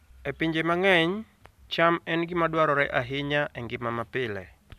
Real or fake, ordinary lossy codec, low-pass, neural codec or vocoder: real; AAC, 96 kbps; 14.4 kHz; none